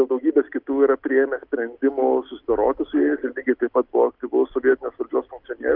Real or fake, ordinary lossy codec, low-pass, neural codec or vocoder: real; Opus, 24 kbps; 5.4 kHz; none